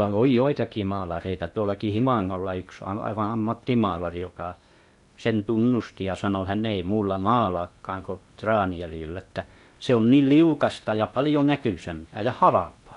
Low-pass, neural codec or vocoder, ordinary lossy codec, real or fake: 10.8 kHz; codec, 16 kHz in and 24 kHz out, 0.8 kbps, FocalCodec, streaming, 65536 codes; none; fake